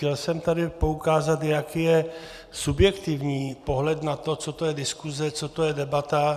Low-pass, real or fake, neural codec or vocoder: 14.4 kHz; real; none